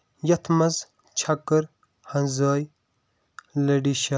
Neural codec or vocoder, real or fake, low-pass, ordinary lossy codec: none; real; none; none